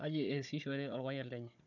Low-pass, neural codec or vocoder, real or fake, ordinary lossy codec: 7.2 kHz; codec, 16 kHz, 16 kbps, FunCodec, trained on Chinese and English, 50 frames a second; fake; none